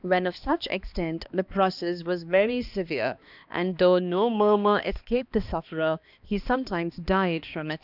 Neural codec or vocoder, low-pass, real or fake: codec, 16 kHz, 2 kbps, X-Codec, HuBERT features, trained on balanced general audio; 5.4 kHz; fake